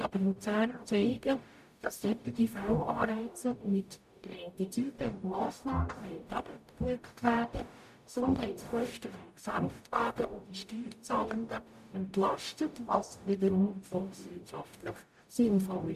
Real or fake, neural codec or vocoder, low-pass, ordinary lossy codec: fake; codec, 44.1 kHz, 0.9 kbps, DAC; 14.4 kHz; MP3, 96 kbps